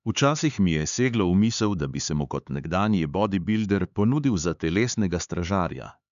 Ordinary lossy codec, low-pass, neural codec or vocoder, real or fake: none; 7.2 kHz; codec, 16 kHz, 4 kbps, X-Codec, HuBERT features, trained on LibriSpeech; fake